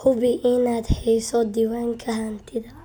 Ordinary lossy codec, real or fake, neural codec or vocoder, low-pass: none; real; none; none